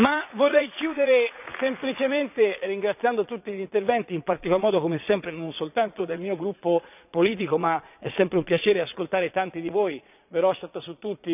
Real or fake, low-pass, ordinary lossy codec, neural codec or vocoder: fake; 3.6 kHz; none; vocoder, 22.05 kHz, 80 mel bands, Vocos